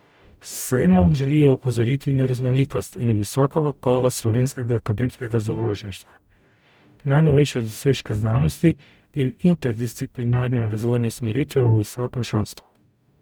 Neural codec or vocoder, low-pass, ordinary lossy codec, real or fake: codec, 44.1 kHz, 0.9 kbps, DAC; none; none; fake